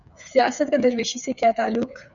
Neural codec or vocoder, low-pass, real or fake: codec, 16 kHz, 8 kbps, FreqCodec, smaller model; 7.2 kHz; fake